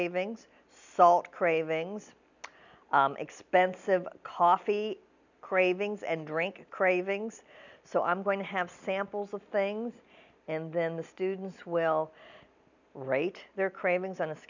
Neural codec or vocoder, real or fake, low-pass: none; real; 7.2 kHz